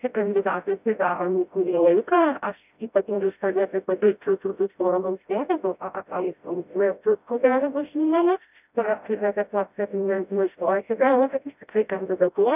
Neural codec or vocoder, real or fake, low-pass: codec, 16 kHz, 0.5 kbps, FreqCodec, smaller model; fake; 3.6 kHz